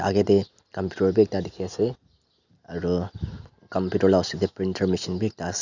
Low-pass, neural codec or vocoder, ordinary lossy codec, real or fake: 7.2 kHz; none; none; real